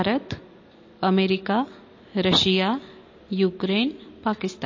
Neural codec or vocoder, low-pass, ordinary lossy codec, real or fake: none; 7.2 kHz; MP3, 32 kbps; real